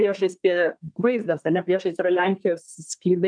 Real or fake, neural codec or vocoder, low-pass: fake; codec, 24 kHz, 1 kbps, SNAC; 9.9 kHz